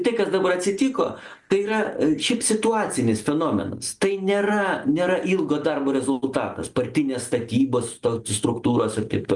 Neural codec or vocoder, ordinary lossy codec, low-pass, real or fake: none; Opus, 32 kbps; 10.8 kHz; real